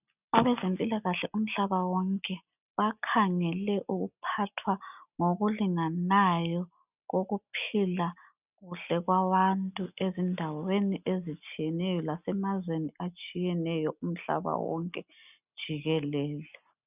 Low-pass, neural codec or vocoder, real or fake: 3.6 kHz; none; real